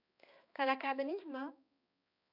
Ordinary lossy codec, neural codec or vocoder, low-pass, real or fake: none; codec, 16 kHz, 2 kbps, X-Codec, HuBERT features, trained on balanced general audio; 5.4 kHz; fake